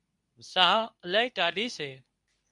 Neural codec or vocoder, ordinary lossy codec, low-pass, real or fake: codec, 24 kHz, 0.9 kbps, WavTokenizer, medium speech release version 2; MP3, 96 kbps; 10.8 kHz; fake